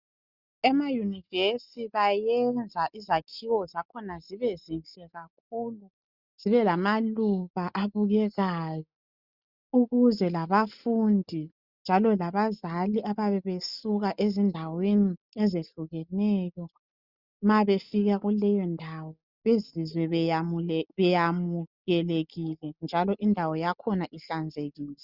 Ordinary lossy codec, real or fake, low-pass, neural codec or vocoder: Opus, 64 kbps; real; 5.4 kHz; none